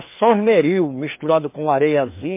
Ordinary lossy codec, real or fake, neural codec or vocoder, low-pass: MP3, 24 kbps; fake; codec, 16 kHz, 2 kbps, FreqCodec, larger model; 3.6 kHz